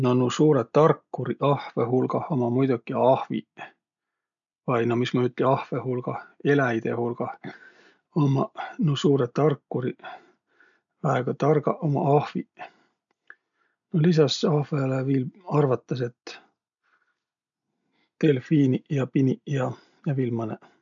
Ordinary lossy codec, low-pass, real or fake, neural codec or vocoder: none; 7.2 kHz; real; none